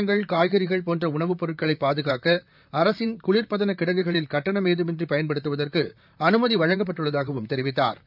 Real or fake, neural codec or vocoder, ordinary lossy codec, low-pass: fake; vocoder, 44.1 kHz, 128 mel bands, Pupu-Vocoder; none; 5.4 kHz